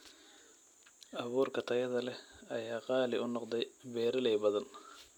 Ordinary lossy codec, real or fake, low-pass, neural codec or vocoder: none; real; 19.8 kHz; none